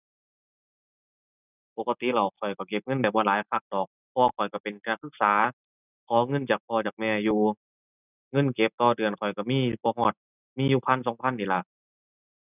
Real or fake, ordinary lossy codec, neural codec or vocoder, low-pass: real; none; none; 3.6 kHz